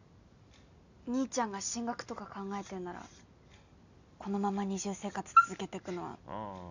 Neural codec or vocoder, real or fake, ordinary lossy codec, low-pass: none; real; none; 7.2 kHz